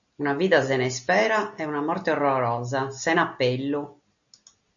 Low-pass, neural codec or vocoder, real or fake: 7.2 kHz; none; real